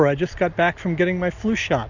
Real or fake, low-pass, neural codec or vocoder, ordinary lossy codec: real; 7.2 kHz; none; Opus, 64 kbps